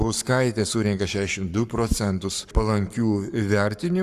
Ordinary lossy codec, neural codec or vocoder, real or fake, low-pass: Opus, 64 kbps; codec, 44.1 kHz, 7.8 kbps, Pupu-Codec; fake; 14.4 kHz